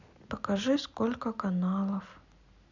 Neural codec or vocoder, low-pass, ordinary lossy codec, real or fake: none; 7.2 kHz; none; real